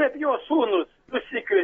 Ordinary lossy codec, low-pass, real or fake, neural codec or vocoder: AAC, 24 kbps; 19.8 kHz; real; none